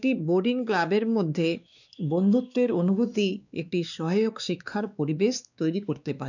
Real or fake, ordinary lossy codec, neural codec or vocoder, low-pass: fake; none; codec, 16 kHz, 2 kbps, X-Codec, WavLM features, trained on Multilingual LibriSpeech; 7.2 kHz